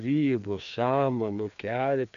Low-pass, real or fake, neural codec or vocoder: 7.2 kHz; fake; codec, 16 kHz, 2 kbps, FreqCodec, larger model